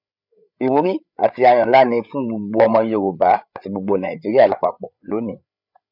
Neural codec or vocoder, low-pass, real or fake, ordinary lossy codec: codec, 16 kHz, 8 kbps, FreqCodec, larger model; 5.4 kHz; fake; none